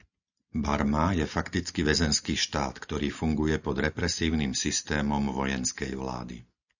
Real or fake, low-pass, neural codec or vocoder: real; 7.2 kHz; none